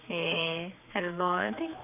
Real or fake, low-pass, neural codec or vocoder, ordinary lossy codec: fake; 3.6 kHz; codec, 16 kHz, 4 kbps, X-Codec, HuBERT features, trained on general audio; none